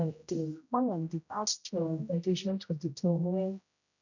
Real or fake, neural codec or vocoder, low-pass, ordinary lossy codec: fake; codec, 16 kHz, 0.5 kbps, X-Codec, HuBERT features, trained on general audio; 7.2 kHz; none